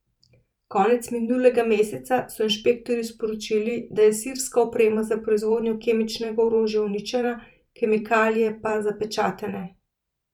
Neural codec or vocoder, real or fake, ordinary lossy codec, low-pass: vocoder, 44.1 kHz, 128 mel bands every 512 samples, BigVGAN v2; fake; none; 19.8 kHz